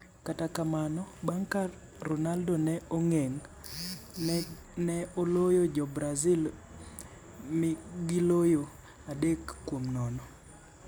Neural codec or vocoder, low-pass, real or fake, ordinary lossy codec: none; none; real; none